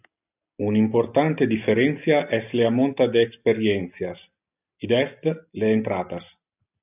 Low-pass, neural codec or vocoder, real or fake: 3.6 kHz; none; real